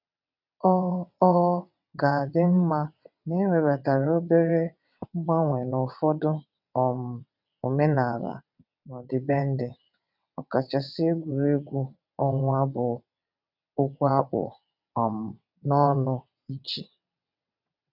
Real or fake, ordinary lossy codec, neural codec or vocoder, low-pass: fake; none; vocoder, 22.05 kHz, 80 mel bands, WaveNeXt; 5.4 kHz